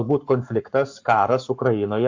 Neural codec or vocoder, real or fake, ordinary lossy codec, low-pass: codec, 44.1 kHz, 7.8 kbps, Pupu-Codec; fake; MP3, 48 kbps; 7.2 kHz